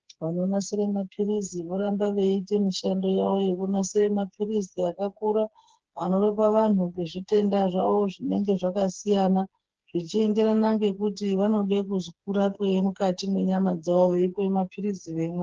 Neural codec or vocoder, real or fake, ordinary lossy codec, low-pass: codec, 16 kHz, 4 kbps, FreqCodec, smaller model; fake; Opus, 16 kbps; 7.2 kHz